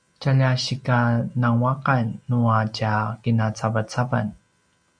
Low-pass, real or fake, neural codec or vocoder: 9.9 kHz; real; none